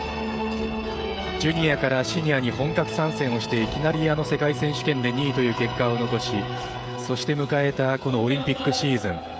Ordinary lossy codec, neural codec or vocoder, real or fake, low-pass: none; codec, 16 kHz, 16 kbps, FreqCodec, smaller model; fake; none